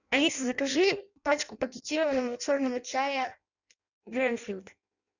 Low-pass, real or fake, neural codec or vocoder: 7.2 kHz; fake; codec, 16 kHz in and 24 kHz out, 0.6 kbps, FireRedTTS-2 codec